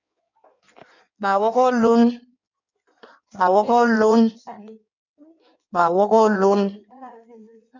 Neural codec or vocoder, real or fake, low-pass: codec, 16 kHz in and 24 kHz out, 1.1 kbps, FireRedTTS-2 codec; fake; 7.2 kHz